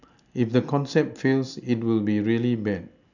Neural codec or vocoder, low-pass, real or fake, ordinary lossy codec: none; 7.2 kHz; real; none